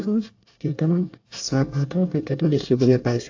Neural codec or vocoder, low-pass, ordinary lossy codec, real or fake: codec, 24 kHz, 1 kbps, SNAC; 7.2 kHz; AAC, 48 kbps; fake